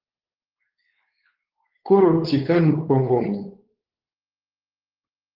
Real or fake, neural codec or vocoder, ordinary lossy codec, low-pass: fake; codec, 16 kHz, 4 kbps, X-Codec, WavLM features, trained on Multilingual LibriSpeech; Opus, 16 kbps; 5.4 kHz